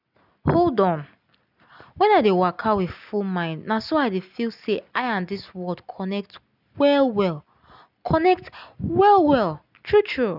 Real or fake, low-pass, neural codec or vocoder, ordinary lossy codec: real; 5.4 kHz; none; none